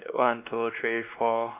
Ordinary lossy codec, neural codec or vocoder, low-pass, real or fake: none; codec, 16 kHz, 2 kbps, X-Codec, WavLM features, trained on Multilingual LibriSpeech; 3.6 kHz; fake